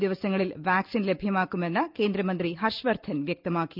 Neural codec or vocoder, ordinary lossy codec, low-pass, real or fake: none; Opus, 24 kbps; 5.4 kHz; real